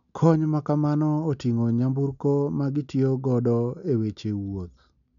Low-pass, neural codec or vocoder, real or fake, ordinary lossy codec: 7.2 kHz; none; real; none